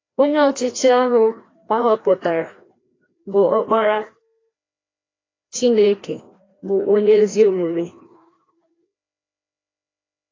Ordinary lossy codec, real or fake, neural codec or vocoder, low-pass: AAC, 32 kbps; fake; codec, 16 kHz, 1 kbps, FreqCodec, larger model; 7.2 kHz